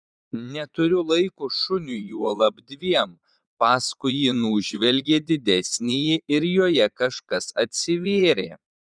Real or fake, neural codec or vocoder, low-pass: fake; vocoder, 22.05 kHz, 80 mel bands, Vocos; 9.9 kHz